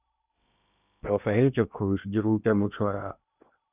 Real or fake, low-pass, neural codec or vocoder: fake; 3.6 kHz; codec, 16 kHz in and 24 kHz out, 0.8 kbps, FocalCodec, streaming, 65536 codes